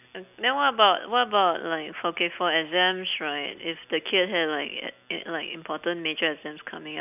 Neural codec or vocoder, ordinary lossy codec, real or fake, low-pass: none; none; real; 3.6 kHz